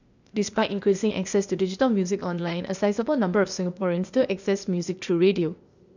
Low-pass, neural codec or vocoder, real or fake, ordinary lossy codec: 7.2 kHz; codec, 16 kHz, 0.8 kbps, ZipCodec; fake; none